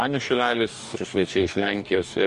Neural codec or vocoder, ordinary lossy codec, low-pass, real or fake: codec, 44.1 kHz, 2.6 kbps, DAC; MP3, 48 kbps; 14.4 kHz; fake